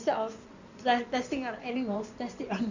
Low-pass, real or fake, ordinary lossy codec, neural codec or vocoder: 7.2 kHz; fake; Opus, 64 kbps; codec, 16 kHz in and 24 kHz out, 2.2 kbps, FireRedTTS-2 codec